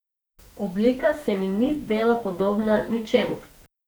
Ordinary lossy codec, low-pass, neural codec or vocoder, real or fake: none; none; codec, 44.1 kHz, 2.6 kbps, SNAC; fake